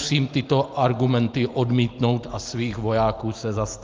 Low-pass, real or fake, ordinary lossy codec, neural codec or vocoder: 7.2 kHz; real; Opus, 32 kbps; none